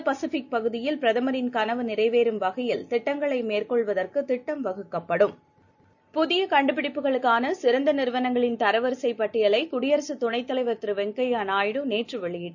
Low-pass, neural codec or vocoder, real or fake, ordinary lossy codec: 7.2 kHz; none; real; none